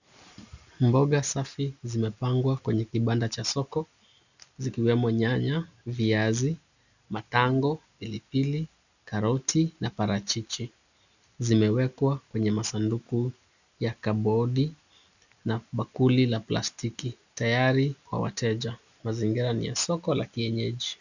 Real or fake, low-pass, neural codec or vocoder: real; 7.2 kHz; none